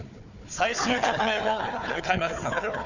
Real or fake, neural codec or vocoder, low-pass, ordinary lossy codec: fake; codec, 16 kHz, 4 kbps, FunCodec, trained on Chinese and English, 50 frames a second; 7.2 kHz; none